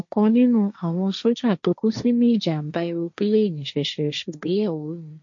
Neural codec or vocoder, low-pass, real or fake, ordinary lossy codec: codec, 16 kHz, 1.1 kbps, Voila-Tokenizer; 7.2 kHz; fake; MP3, 48 kbps